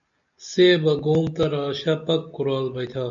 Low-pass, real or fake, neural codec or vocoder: 7.2 kHz; real; none